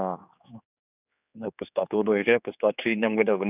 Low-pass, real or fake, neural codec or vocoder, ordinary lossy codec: 3.6 kHz; fake; codec, 16 kHz, 4 kbps, X-Codec, HuBERT features, trained on general audio; none